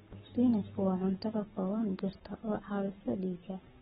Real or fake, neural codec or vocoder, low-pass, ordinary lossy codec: fake; codec, 44.1 kHz, 7.8 kbps, Pupu-Codec; 19.8 kHz; AAC, 16 kbps